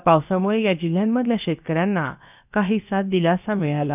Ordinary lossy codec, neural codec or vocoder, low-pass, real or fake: none; codec, 16 kHz, about 1 kbps, DyCAST, with the encoder's durations; 3.6 kHz; fake